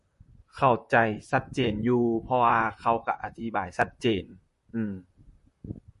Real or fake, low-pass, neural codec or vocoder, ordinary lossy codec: fake; 14.4 kHz; vocoder, 44.1 kHz, 128 mel bands, Pupu-Vocoder; MP3, 48 kbps